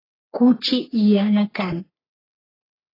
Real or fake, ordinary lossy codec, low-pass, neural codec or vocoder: fake; AAC, 24 kbps; 5.4 kHz; codec, 44.1 kHz, 3.4 kbps, Pupu-Codec